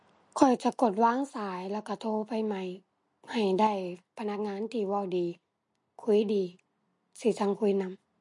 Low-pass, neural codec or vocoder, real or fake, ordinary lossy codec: 10.8 kHz; none; real; MP3, 48 kbps